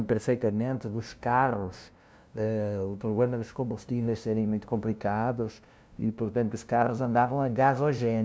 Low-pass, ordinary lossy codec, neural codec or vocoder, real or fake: none; none; codec, 16 kHz, 0.5 kbps, FunCodec, trained on LibriTTS, 25 frames a second; fake